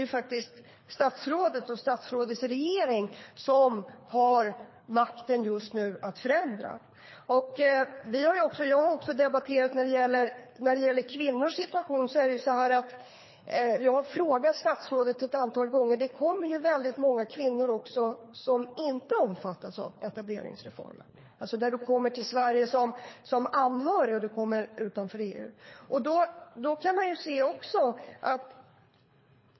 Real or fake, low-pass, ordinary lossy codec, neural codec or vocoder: fake; 7.2 kHz; MP3, 24 kbps; codec, 24 kHz, 3 kbps, HILCodec